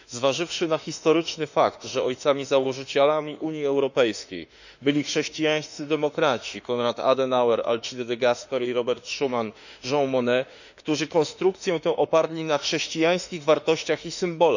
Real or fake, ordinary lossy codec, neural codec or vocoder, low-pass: fake; none; autoencoder, 48 kHz, 32 numbers a frame, DAC-VAE, trained on Japanese speech; 7.2 kHz